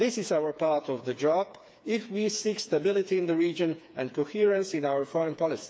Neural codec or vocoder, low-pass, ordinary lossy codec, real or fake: codec, 16 kHz, 4 kbps, FreqCodec, smaller model; none; none; fake